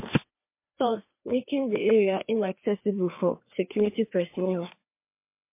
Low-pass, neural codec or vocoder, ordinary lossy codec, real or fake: 3.6 kHz; codec, 16 kHz, 2 kbps, FreqCodec, larger model; MP3, 24 kbps; fake